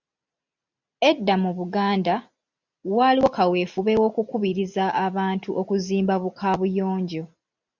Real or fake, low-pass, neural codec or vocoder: real; 7.2 kHz; none